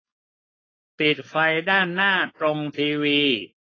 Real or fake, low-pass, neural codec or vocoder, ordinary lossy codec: fake; 7.2 kHz; codec, 16 kHz, 4.8 kbps, FACodec; AAC, 32 kbps